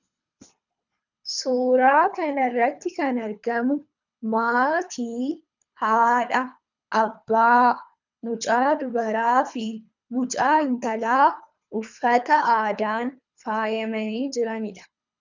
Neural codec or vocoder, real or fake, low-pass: codec, 24 kHz, 3 kbps, HILCodec; fake; 7.2 kHz